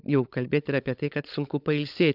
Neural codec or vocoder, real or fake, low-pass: codec, 16 kHz, 16 kbps, FunCodec, trained on LibriTTS, 50 frames a second; fake; 5.4 kHz